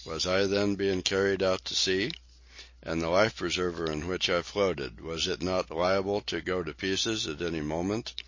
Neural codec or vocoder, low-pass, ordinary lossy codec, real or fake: none; 7.2 kHz; MP3, 32 kbps; real